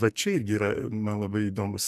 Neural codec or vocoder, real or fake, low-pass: codec, 44.1 kHz, 2.6 kbps, SNAC; fake; 14.4 kHz